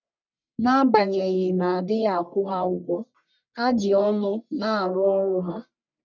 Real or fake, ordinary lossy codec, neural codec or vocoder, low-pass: fake; none; codec, 44.1 kHz, 1.7 kbps, Pupu-Codec; 7.2 kHz